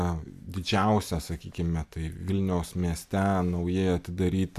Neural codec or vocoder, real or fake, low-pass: none; real; 14.4 kHz